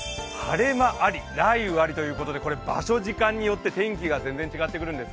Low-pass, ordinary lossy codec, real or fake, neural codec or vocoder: none; none; real; none